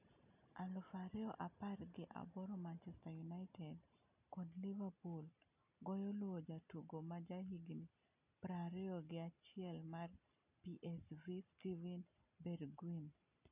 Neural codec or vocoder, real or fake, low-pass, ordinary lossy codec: none; real; 3.6 kHz; none